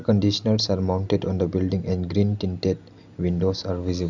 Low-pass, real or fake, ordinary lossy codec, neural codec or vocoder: 7.2 kHz; real; none; none